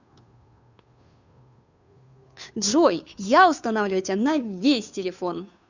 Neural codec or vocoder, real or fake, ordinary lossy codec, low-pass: codec, 16 kHz, 2 kbps, FunCodec, trained on Chinese and English, 25 frames a second; fake; none; 7.2 kHz